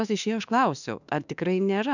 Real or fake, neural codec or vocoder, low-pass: fake; autoencoder, 48 kHz, 32 numbers a frame, DAC-VAE, trained on Japanese speech; 7.2 kHz